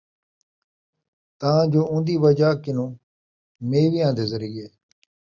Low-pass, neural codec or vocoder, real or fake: 7.2 kHz; none; real